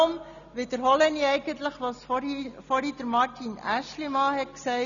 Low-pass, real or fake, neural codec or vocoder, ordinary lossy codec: 7.2 kHz; real; none; none